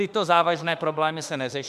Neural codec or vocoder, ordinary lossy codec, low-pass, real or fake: autoencoder, 48 kHz, 32 numbers a frame, DAC-VAE, trained on Japanese speech; MP3, 96 kbps; 14.4 kHz; fake